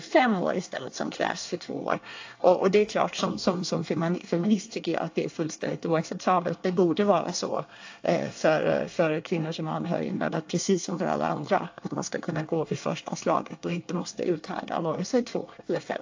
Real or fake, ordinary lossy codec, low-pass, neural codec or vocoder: fake; AAC, 48 kbps; 7.2 kHz; codec, 24 kHz, 1 kbps, SNAC